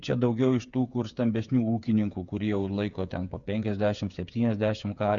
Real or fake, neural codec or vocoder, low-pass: fake; codec, 16 kHz, 8 kbps, FreqCodec, smaller model; 7.2 kHz